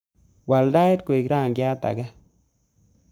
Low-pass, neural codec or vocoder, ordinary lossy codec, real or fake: none; codec, 44.1 kHz, 7.8 kbps, Pupu-Codec; none; fake